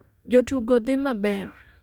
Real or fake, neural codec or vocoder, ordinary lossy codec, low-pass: fake; codec, 44.1 kHz, 2.6 kbps, DAC; MP3, 96 kbps; 19.8 kHz